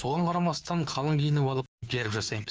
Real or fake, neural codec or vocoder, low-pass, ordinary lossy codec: fake; codec, 16 kHz, 2 kbps, FunCodec, trained on Chinese and English, 25 frames a second; none; none